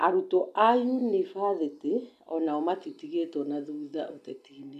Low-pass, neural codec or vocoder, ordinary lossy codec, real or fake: 14.4 kHz; none; none; real